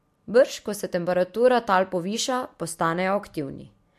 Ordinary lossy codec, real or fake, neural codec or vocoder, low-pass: MP3, 64 kbps; real; none; 14.4 kHz